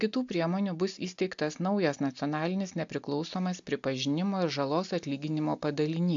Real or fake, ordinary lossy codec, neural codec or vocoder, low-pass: real; AAC, 64 kbps; none; 7.2 kHz